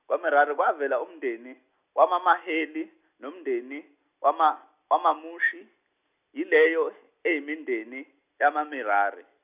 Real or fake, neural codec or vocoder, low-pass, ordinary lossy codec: real; none; 3.6 kHz; none